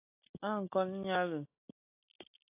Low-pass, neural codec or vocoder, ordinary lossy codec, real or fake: 3.6 kHz; none; AAC, 32 kbps; real